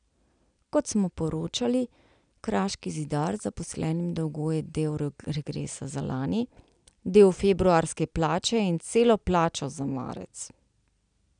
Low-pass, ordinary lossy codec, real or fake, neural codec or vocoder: 9.9 kHz; none; real; none